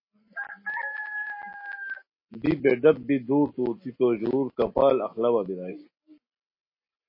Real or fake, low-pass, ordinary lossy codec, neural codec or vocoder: real; 5.4 kHz; MP3, 24 kbps; none